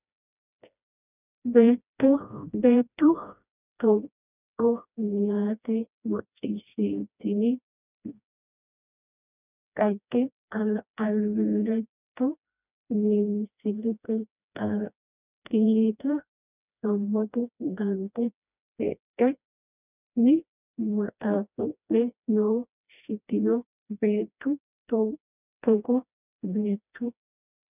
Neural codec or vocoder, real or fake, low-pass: codec, 16 kHz, 1 kbps, FreqCodec, smaller model; fake; 3.6 kHz